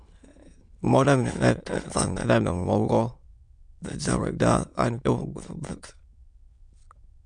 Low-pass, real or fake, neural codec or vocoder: 9.9 kHz; fake; autoencoder, 22.05 kHz, a latent of 192 numbers a frame, VITS, trained on many speakers